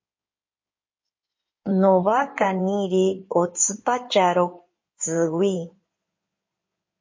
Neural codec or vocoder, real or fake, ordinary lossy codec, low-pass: codec, 16 kHz in and 24 kHz out, 2.2 kbps, FireRedTTS-2 codec; fake; MP3, 32 kbps; 7.2 kHz